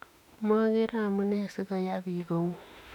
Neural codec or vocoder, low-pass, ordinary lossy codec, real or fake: autoencoder, 48 kHz, 32 numbers a frame, DAC-VAE, trained on Japanese speech; 19.8 kHz; none; fake